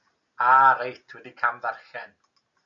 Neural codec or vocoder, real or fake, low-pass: none; real; 7.2 kHz